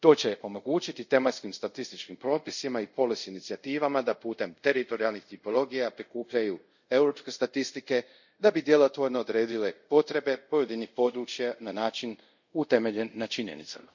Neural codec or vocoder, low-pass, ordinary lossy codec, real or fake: codec, 24 kHz, 0.5 kbps, DualCodec; 7.2 kHz; none; fake